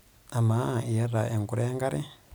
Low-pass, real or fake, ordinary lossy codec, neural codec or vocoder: none; real; none; none